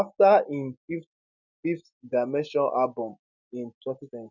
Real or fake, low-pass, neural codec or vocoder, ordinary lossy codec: real; 7.2 kHz; none; none